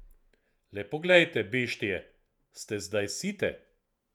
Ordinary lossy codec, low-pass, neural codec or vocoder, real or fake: none; 19.8 kHz; vocoder, 48 kHz, 128 mel bands, Vocos; fake